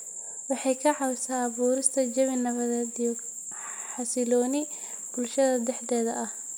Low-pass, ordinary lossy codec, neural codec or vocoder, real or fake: none; none; none; real